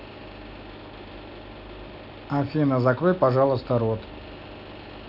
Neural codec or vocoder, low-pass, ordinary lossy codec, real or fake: none; 5.4 kHz; none; real